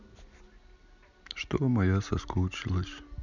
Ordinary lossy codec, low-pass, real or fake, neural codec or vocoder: none; 7.2 kHz; real; none